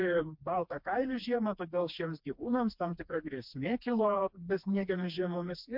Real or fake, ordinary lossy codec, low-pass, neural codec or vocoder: fake; MP3, 48 kbps; 5.4 kHz; codec, 16 kHz, 2 kbps, FreqCodec, smaller model